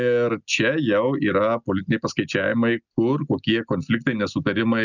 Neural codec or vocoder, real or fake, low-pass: none; real; 7.2 kHz